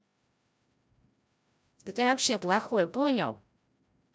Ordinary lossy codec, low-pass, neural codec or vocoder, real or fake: none; none; codec, 16 kHz, 0.5 kbps, FreqCodec, larger model; fake